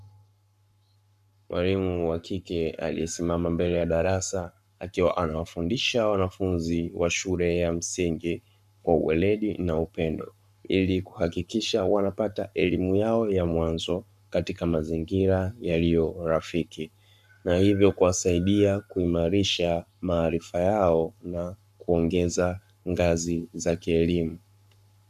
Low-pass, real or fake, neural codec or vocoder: 14.4 kHz; fake; codec, 44.1 kHz, 7.8 kbps, Pupu-Codec